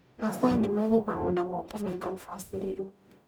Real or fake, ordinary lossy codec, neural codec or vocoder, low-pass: fake; none; codec, 44.1 kHz, 0.9 kbps, DAC; none